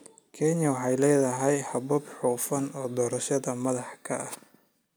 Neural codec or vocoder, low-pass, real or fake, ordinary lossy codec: none; none; real; none